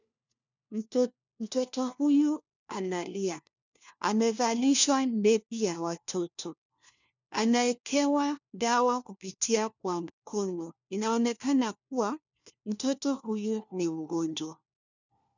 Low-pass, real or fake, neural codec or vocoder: 7.2 kHz; fake; codec, 16 kHz, 1 kbps, FunCodec, trained on LibriTTS, 50 frames a second